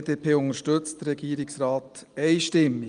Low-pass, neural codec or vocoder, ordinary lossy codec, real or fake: 9.9 kHz; none; Opus, 32 kbps; real